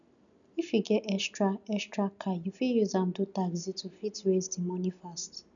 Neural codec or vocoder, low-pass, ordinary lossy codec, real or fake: none; 7.2 kHz; none; real